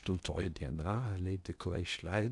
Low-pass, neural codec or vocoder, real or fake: 10.8 kHz; codec, 16 kHz in and 24 kHz out, 0.8 kbps, FocalCodec, streaming, 65536 codes; fake